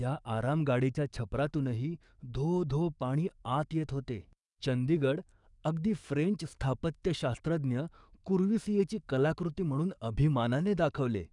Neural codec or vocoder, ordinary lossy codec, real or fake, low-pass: codec, 44.1 kHz, 7.8 kbps, DAC; none; fake; 10.8 kHz